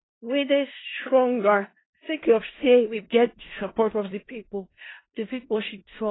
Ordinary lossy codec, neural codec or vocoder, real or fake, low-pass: AAC, 16 kbps; codec, 16 kHz in and 24 kHz out, 0.4 kbps, LongCat-Audio-Codec, four codebook decoder; fake; 7.2 kHz